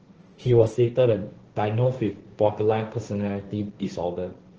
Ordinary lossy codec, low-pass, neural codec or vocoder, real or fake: Opus, 16 kbps; 7.2 kHz; codec, 16 kHz, 1.1 kbps, Voila-Tokenizer; fake